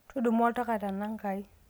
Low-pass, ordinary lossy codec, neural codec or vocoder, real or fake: none; none; none; real